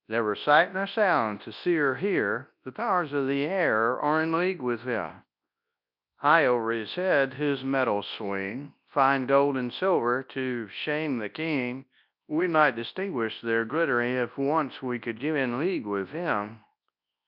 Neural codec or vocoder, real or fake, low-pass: codec, 24 kHz, 0.9 kbps, WavTokenizer, large speech release; fake; 5.4 kHz